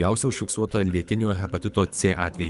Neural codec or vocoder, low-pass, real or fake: codec, 24 kHz, 3 kbps, HILCodec; 10.8 kHz; fake